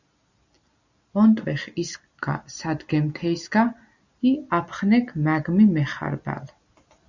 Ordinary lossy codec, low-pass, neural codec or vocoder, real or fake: Opus, 64 kbps; 7.2 kHz; none; real